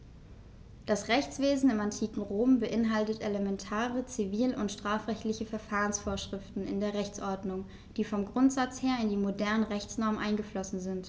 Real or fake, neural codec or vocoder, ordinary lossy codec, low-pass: real; none; none; none